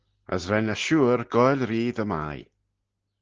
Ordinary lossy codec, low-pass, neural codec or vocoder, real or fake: Opus, 16 kbps; 7.2 kHz; none; real